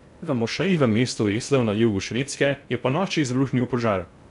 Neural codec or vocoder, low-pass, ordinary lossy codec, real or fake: codec, 16 kHz in and 24 kHz out, 0.6 kbps, FocalCodec, streaming, 2048 codes; 10.8 kHz; none; fake